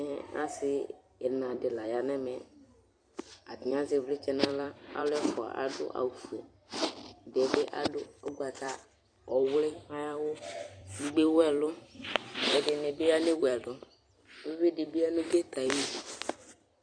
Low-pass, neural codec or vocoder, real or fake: 9.9 kHz; vocoder, 44.1 kHz, 128 mel bands every 256 samples, BigVGAN v2; fake